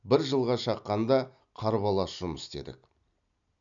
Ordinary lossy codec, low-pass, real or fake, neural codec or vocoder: none; 7.2 kHz; real; none